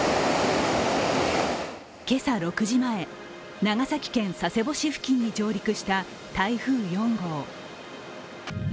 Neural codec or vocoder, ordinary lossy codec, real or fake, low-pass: none; none; real; none